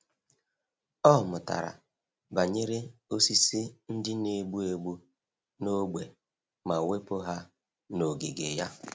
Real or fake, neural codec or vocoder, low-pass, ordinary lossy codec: real; none; none; none